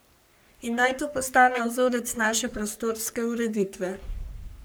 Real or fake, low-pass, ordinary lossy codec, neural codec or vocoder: fake; none; none; codec, 44.1 kHz, 3.4 kbps, Pupu-Codec